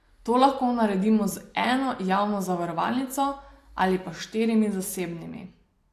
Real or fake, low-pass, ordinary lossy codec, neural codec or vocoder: real; 14.4 kHz; AAC, 64 kbps; none